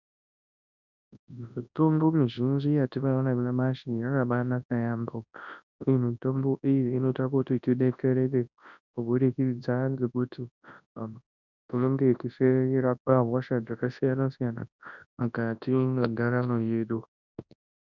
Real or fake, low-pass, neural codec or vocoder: fake; 7.2 kHz; codec, 24 kHz, 0.9 kbps, WavTokenizer, large speech release